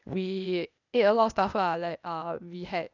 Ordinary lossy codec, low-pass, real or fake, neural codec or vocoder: none; 7.2 kHz; fake; codec, 16 kHz, 0.8 kbps, ZipCodec